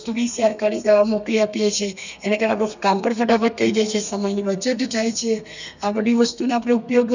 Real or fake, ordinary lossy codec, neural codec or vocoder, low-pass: fake; none; codec, 32 kHz, 1.9 kbps, SNAC; 7.2 kHz